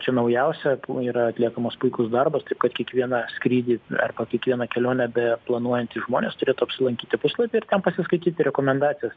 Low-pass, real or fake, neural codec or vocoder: 7.2 kHz; real; none